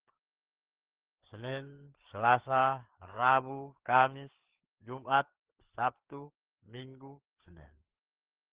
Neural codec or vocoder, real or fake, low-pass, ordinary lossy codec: codec, 16 kHz, 4 kbps, FreqCodec, larger model; fake; 3.6 kHz; Opus, 16 kbps